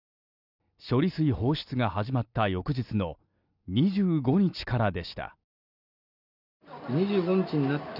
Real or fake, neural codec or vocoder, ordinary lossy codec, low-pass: real; none; none; 5.4 kHz